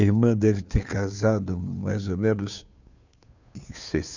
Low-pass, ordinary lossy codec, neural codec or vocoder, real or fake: 7.2 kHz; none; codec, 16 kHz, 2 kbps, FunCodec, trained on Chinese and English, 25 frames a second; fake